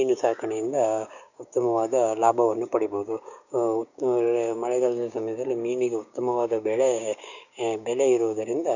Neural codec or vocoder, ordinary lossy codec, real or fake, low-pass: codec, 16 kHz, 6 kbps, DAC; none; fake; 7.2 kHz